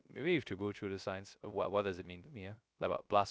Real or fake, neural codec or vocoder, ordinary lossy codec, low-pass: fake; codec, 16 kHz, 0.3 kbps, FocalCodec; none; none